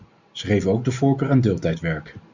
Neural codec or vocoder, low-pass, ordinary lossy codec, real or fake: none; 7.2 kHz; Opus, 64 kbps; real